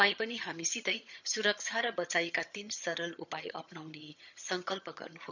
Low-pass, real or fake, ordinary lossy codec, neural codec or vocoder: 7.2 kHz; fake; none; vocoder, 22.05 kHz, 80 mel bands, HiFi-GAN